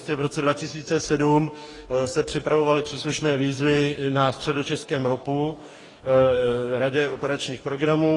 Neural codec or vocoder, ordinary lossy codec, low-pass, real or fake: codec, 44.1 kHz, 2.6 kbps, DAC; AAC, 32 kbps; 10.8 kHz; fake